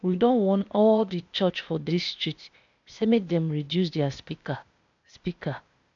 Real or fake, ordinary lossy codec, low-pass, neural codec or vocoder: fake; AAC, 64 kbps; 7.2 kHz; codec, 16 kHz, 0.8 kbps, ZipCodec